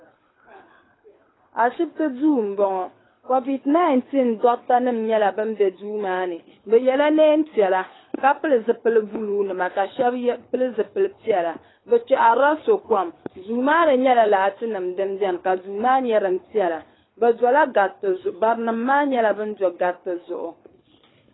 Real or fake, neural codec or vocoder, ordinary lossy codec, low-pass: fake; codec, 24 kHz, 6 kbps, HILCodec; AAC, 16 kbps; 7.2 kHz